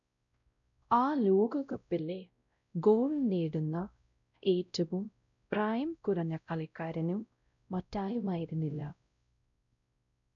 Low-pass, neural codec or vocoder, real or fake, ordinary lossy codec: 7.2 kHz; codec, 16 kHz, 0.5 kbps, X-Codec, WavLM features, trained on Multilingual LibriSpeech; fake; none